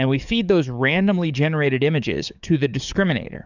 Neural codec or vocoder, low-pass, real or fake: codec, 44.1 kHz, 7.8 kbps, DAC; 7.2 kHz; fake